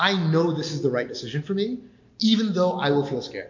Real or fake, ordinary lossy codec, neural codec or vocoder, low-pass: real; MP3, 48 kbps; none; 7.2 kHz